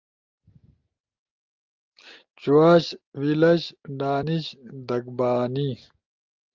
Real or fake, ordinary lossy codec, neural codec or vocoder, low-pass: real; Opus, 24 kbps; none; 7.2 kHz